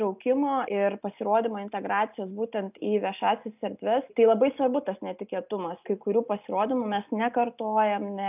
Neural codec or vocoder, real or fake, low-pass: none; real; 3.6 kHz